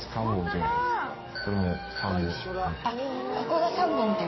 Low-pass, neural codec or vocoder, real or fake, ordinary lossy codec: 7.2 kHz; codec, 44.1 kHz, 7.8 kbps, DAC; fake; MP3, 24 kbps